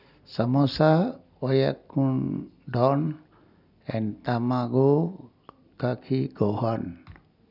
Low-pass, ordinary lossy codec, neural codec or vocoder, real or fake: 5.4 kHz; none; none; real